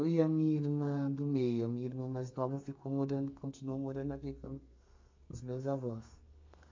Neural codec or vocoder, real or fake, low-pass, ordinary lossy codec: codec, 32 kHz, 1.9 kbps, SNAC; fake; 7.2 kHz; MP3, 48 kbps